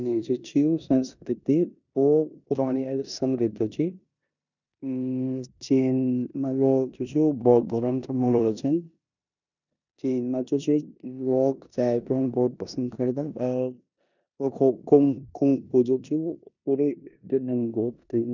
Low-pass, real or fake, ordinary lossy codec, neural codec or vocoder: 7.2 kHz; fake; none; codec, 16 kHz in and 24 kHz out, 0.9 kbps, LongCat-Audio-Codec, four codebook decoder